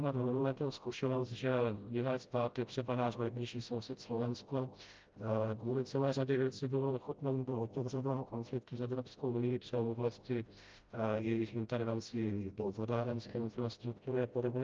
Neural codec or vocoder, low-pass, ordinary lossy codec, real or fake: codec, 16 kHz, 0.5 kbps, FreqCodec, smaller model; 7.2 kHz; Opus, 24 kbps; fake